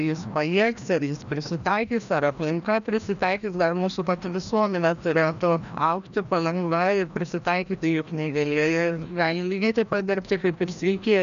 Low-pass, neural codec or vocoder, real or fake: 7.2 kHz; codec, 16 kHz, 1 kbps, FreqCodec, larger model; fake